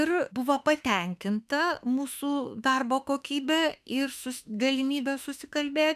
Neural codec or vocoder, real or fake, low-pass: autoencoder, 48 kHz, 32 numbers a frame, DAC-VAE, trained on Japanese speech; fake; 14.4 kHz